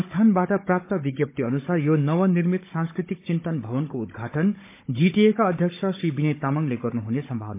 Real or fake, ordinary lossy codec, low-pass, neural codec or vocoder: fake; MP3, 24 kbps; 3.6 kHz; codec, 16 kHz, 16 kbps, FunCodec, trained on Chinese and English, 50 frames a second